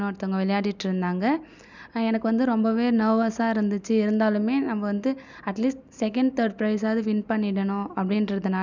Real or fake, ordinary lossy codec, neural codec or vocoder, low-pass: real; none; none; 7.2 kHz